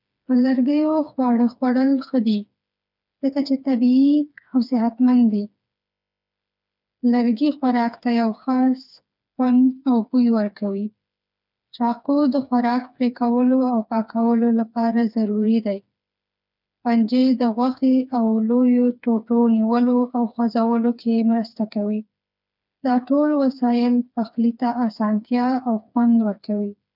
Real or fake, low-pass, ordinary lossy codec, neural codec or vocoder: fake; 5.4 kHz; none; codec, 16 kHz, 4 kbps, FreqCodec, smaller model